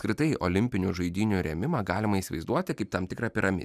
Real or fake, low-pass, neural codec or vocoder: real; 14.4 kHz; none